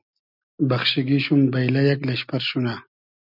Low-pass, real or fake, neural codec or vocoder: 5.4 kHz; real; none